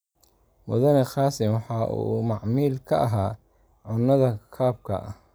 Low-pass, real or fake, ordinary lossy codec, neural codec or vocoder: none; real; none; none